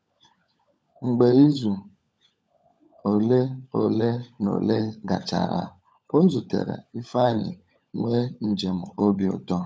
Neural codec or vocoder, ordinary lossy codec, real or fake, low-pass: codec, 16 kHz, 16 kbps, FunCodec, trained on LibriTTS, 50 frames a second; none; fake; none